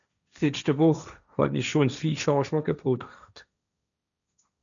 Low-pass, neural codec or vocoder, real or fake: 7.2 kHz; codec, 16 kHz, 1.1 kbps, Voila-Tokenizer; fake